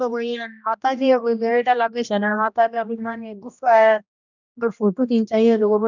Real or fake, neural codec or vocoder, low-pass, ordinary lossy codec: fake; codec, 16 kHz, 1 kbps, X-Codec, HuBERT features, trained on general audio; 7.2 kHz; none